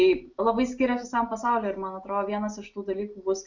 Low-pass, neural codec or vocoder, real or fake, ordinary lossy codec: 7.2 kHz; none; real; Opus, 64 kbps